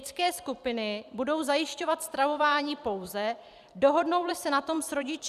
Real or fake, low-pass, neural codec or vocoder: fake; 14.4 kHz; vocoder, 44.1 kHz, 128 mel bands every 256 samples, BigVGAN v2